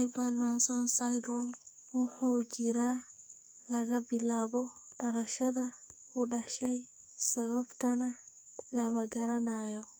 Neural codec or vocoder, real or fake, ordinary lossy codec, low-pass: codec, 44.1 kHz, 2.6 kbps, SNAC; fake; none; none